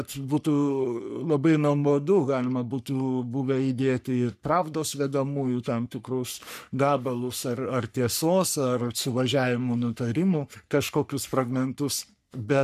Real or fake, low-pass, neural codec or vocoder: fake; 14.4 kHz; codec, 44.1 kHz, 3.4 kbps, Pupu-Codec